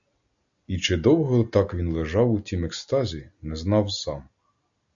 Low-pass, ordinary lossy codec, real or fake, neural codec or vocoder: 7.2 kHz; AAC, 64 kbps; real; none